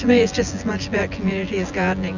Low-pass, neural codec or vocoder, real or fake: 7.2 kHz; vocoder, 24 kHz, 100 mel bands, Vocos; fake